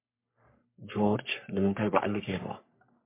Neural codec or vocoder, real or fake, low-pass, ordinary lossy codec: codec, 44.1 kHz, 3.4 kbps, Pupu-Codec; fake; 3.6 kHz; MP3, 24 kbps